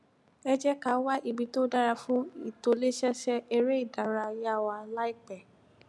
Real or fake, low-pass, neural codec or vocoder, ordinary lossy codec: real; none; none; none